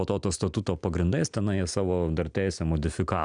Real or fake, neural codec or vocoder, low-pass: real; none; 9.9 kHz